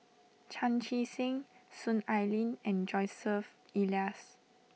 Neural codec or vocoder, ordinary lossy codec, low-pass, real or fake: none; none; none; real